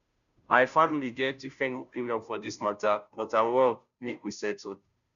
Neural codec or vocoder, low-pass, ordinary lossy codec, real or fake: codec, 16 kHz, 0.5 kbps, FunCodec, trained on Chinese and English, 25 frames a second; 7.2 kHz; none; fake